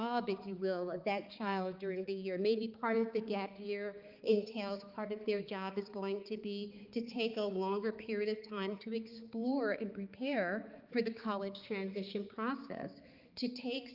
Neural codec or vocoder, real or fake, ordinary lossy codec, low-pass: codec, 16 kHz, 4 kbps, X-Codec, HuBERT features, trained on balanced general audio; fake; Opus, 24 kbps; 5.4 kHz